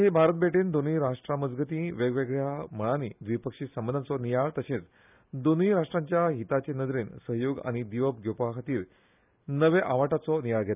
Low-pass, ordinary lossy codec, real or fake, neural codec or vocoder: 3.6 kHz; none; real; none